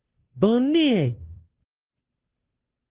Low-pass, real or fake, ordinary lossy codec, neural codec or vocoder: 3.6 kHz; fake; Opus, 16 kbps; codec, 16 kHz, 2 kbps, FunCodec, trained on Chinese and English, 25 frames a second